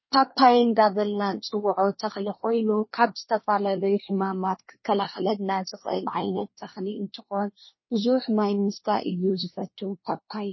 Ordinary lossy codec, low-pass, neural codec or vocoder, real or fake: MP3, 24 kbps; 7.2 kHz; codec, 24 kHz, 1 kbps, SNAC; fake